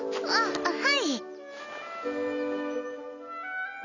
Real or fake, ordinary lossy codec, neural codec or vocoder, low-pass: real; none; none; 7.2 kHz